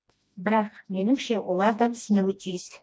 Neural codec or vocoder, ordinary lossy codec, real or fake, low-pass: codec, 16 kHz, 1 kbps, FreqCodec, smaller model; none; fake; none